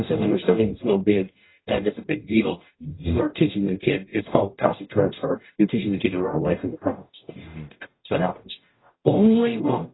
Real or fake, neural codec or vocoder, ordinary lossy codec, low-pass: fake; codec, 44.1 kHz, 0.9 kbps, DAC; AAC, 16 kbps; 7.2 kHz